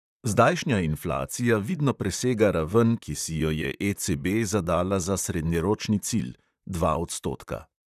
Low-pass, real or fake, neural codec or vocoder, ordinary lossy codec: 14.4 kHz; fake; vocoder, 44.1 kHz, 128 mel bands, Pupu-Vocoder; none